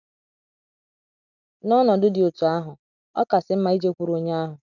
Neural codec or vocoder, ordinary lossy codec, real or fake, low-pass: none; none; real; 7.2 kHz